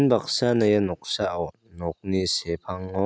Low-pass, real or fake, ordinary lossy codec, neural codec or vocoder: none; real; none; none